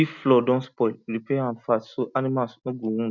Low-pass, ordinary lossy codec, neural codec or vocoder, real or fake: 7.2 kHz; none; none; real